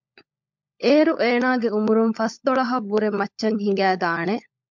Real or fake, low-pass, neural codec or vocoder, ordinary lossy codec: fake; 7.2 kHz; codec, 16 kHz, 16 kbps, FunCodec, trained on LibriTTS, 50 frames a second; MP3, 64 kbps